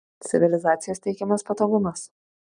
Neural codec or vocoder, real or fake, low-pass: none; real; 9.9 kHz